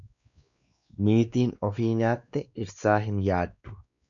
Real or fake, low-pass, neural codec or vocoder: fake; 7.2 kHz; codec, 16 kHz, 2 kbps, X-Codec, WavLM features, trained on Multilingual LibriSpeech